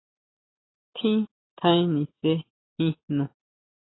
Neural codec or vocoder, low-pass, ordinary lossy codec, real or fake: none; 7.2 kHz; AAC, 16 kbps; real